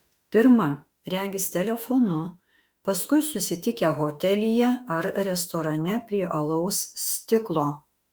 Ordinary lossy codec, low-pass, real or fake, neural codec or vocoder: Opus, 64 kbps; 19.8 kHz; fake; autoencoder, 48 kHz, 32 numbers a frame, DAC-VAE, trained on Japanese speech